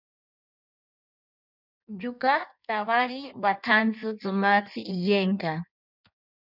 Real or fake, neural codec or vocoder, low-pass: fake; codec, 16 kHz in and 24 kHz out, 1.1 kbps, FireRedTTS-2 codec; 5.4 kHz